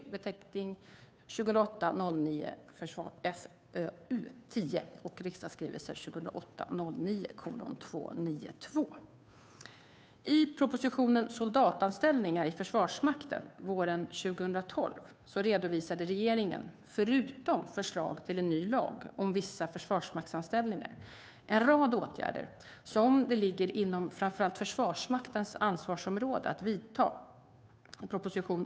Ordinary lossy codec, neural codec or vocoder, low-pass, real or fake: none; codec, 16 kHz, 2 kbps, FunCodec, trained on Chinese and English, 25 frames a second; none; fake